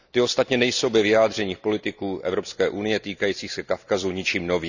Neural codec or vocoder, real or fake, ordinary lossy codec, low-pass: none; real; none; 7.2 kHz